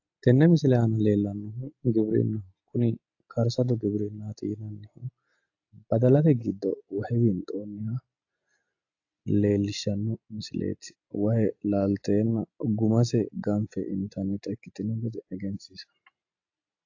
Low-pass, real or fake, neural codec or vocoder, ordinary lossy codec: 7.2 kHz; real; none; AAC, 48 kbps